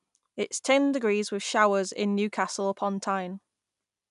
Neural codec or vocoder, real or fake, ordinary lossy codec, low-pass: none; real; none; 10.8 kHz